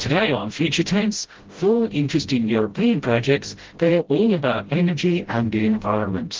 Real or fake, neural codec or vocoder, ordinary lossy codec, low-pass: fake; codec, 16 kHz, 0.5 kbps, FreqCodec, smaller model; Opus, 16 kbps; 7.2 kHz